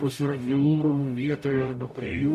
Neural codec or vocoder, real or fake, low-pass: codec, 44.1 kHz, 0.9 kbps, DAC; fake; 14.4 kHz